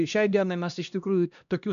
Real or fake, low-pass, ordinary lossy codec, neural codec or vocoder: fake; 7.2 kHz; AAC, 96 kbps; codec, 16 kHz, 1 kbps, X-Codec, HuBERT features, trained on LibriSpeech